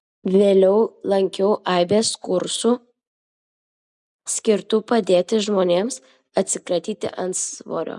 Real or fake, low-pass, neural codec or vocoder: real; 10.8 kHz; none